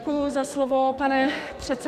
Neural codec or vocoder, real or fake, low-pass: codec, 44.1 kHz, 2.6 kbps, SNAC; fake; 14.4 kHz